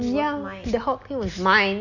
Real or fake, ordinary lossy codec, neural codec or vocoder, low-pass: real; none; none; 7.2 kHz